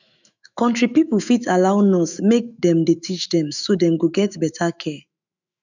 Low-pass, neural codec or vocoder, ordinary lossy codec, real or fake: 7.2 kHz; autoencoder, 48 kHz, 128 numbers a frame, DAC-VAE, trained on Japanese speech; none; fake